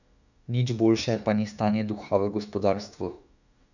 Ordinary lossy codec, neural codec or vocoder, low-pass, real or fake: none; autoencoder, 48 kHz, 32 numbers a frame, DAC-VAE, trained on Japanese speech; 7.2 kHz; fake